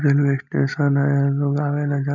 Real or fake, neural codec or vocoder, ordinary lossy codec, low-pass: real; none; none; 7.2 kHz